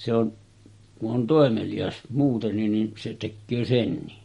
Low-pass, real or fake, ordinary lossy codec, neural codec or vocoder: 19.8 kHz; real; MP3, 48 kbps; none